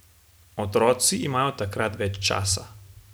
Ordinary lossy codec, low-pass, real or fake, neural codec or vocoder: none; none; real; none